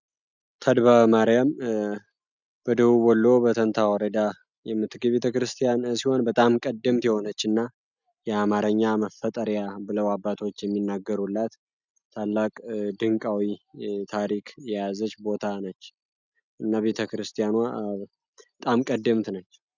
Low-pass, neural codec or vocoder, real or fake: 7.2 kHz; none; real